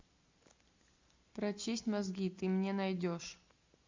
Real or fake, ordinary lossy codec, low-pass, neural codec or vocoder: real; MP3, 48 kbps; 7.2 kHz; none